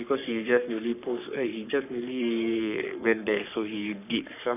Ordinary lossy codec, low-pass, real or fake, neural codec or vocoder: none; 3.6 kHz; fake; codec, 44.1 kHz, 3.4 kbps, Pupu-Codec